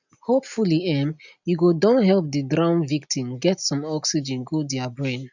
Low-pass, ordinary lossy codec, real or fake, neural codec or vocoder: 7.2 kHz; none; real; none